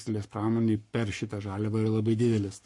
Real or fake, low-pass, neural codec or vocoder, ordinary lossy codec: fake; 10.8 kHz; codec, 44.1 kHz, 7.8 kbps, Pupu-Codec; MP3, 48 kbps